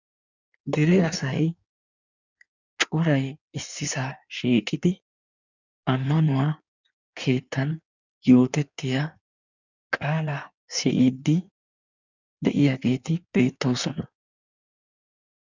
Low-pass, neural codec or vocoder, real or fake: 7.2 kHz; codec, 16 kHz in and 24 kHz out, 1.1 kbps, FireRedTTS-2 codec; fake